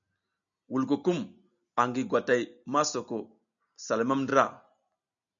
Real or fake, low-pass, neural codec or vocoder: real; 7.2 kHz; none